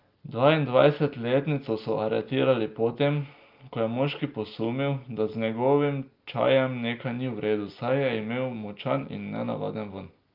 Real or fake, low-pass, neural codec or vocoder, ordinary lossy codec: real; 5.4 kHz; none; Opus, 24 kbps